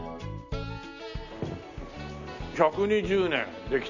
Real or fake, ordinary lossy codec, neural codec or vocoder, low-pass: real; none; none; 7.2 kHz